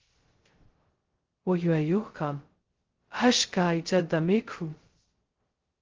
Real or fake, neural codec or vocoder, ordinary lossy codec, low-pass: fake; codec, 16 kHz, 0.2 kbps, FocalCodec; Opus, 16 kbps; 7.2 kHz